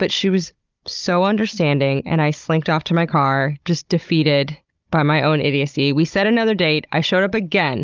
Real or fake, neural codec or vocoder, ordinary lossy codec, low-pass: fake; codec, 16 kHz, 16 kbps, FunCodec, trained on Chinese and English, 50 frames a second; Opus, 32 kbps; 7.2 kHz